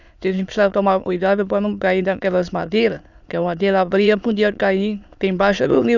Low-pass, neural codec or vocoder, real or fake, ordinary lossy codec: 7.2 kHz; autoencoder, 22.05 kHz, a latent of 192 numbers a frame, VITS, trained on many speakers; fake; none